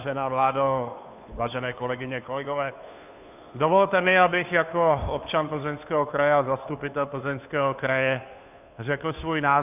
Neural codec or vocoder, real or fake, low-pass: codec, 16 kHz, 2 kbps, FunCodec, trained on Chinese and English, 25 frames a second; fake; 3.6 kHz